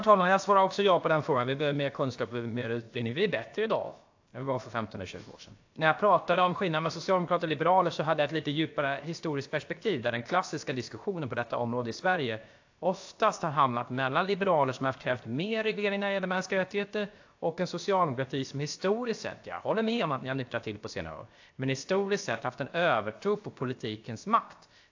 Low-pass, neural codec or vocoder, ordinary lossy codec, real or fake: 7.2 kHz; codec, 16 kHz, about 1 kbps, DyCAST, with the encoder's durations; AAC, 48 kbps; fake